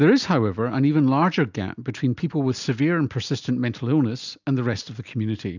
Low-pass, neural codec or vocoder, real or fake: 7.2 kHz; none; real